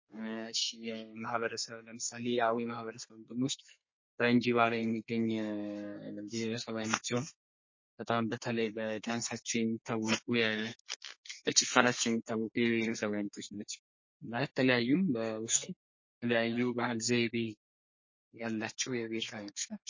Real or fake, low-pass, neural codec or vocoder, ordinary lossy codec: fake; 7.2 kHz; codec, 32 kHz, 1.9 kbps, SNAC; MP3, 32 kbps